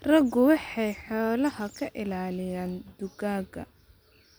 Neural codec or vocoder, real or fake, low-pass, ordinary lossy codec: none; real; none; none